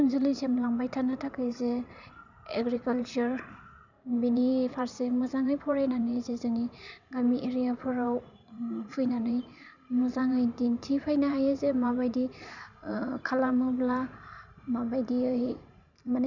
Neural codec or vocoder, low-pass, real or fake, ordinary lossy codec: vocoder, 44.1 kHz, 128 mel bands every 512 samples, BigVGAN v2; 7.2 kHz; fake; none